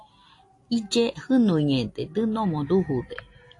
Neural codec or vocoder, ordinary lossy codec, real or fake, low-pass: none; AAC, 48 kbps; real; 10.8 kHz